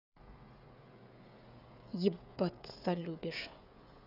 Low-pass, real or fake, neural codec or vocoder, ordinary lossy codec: 5.4 kHz; fake; codec, 16 kHz, 16 kbps, FreqCodec, smaller model; none